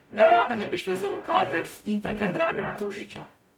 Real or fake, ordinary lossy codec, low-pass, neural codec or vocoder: fake; none; 19.8 kHz; codec, 44.1 kHz, 0.9 kbps, DAC